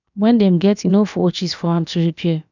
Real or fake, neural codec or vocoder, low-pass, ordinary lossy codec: fake; codec, 16 kHz, about 1 kbps, DyCAST, with the encoder's durations; 7.2 kHz; none